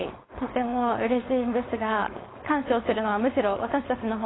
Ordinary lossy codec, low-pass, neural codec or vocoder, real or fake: AAC, 16 kbps; 7.2 kHz; codec, 16 kHz, 4.8 kbps, FACodec; fake